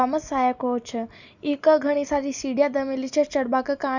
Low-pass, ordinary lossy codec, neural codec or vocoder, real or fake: 7.2 kHz; none; none; real